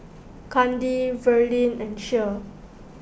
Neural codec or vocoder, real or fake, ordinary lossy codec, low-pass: none; real; none; none